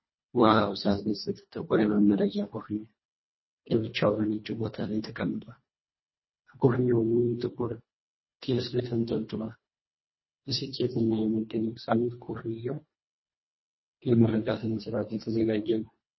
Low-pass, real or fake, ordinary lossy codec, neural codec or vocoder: 7.2 kHz; fake; MP3, 24 kbps; codec, 24 kHz, 1.5 kbps, HILCodec